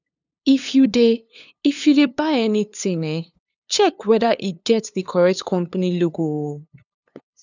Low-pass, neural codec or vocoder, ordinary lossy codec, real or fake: 7.2 kHz; codec, 16 kHz, 2 kbps, FunCodec, trained on LibriTTS, 25 frames a second; none; fake